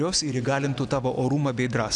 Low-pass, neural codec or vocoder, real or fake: 10.8 kHz; none; real